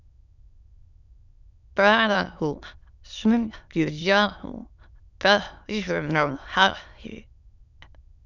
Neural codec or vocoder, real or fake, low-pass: autoencoder, 22.05 kHz, a latent of 192 numbers a frame, VITS, trained on many speakers; fake; 7.2 kHz